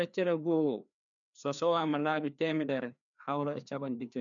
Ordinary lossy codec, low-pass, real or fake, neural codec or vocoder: none; 7.2 kHz; fake; codec, 16 kHz, 2 kbps, FreqCodec, larger model